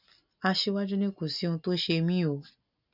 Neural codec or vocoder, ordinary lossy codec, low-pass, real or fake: none; none; 5.4 kHz; real